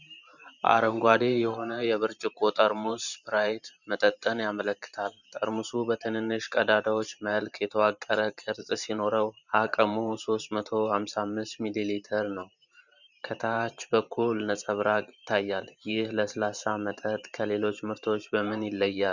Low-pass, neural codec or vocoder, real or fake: 7.2 kHz; vocoder, 44.1 kHz, 128 mel bands every 512 samples, BigVGAN v2; fake